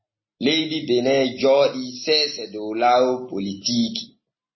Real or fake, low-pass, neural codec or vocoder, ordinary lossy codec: real; 7.2 kHz; none; MP3, 24 kbps